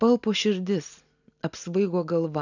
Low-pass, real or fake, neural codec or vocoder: 7.2 kHz; real; none